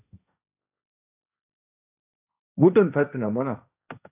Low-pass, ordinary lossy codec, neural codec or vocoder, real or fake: 3.6 kHz; AAC, 32 kbps; codec, 16 kHz, 1.1 kbps, Voila-Tokenizer; fake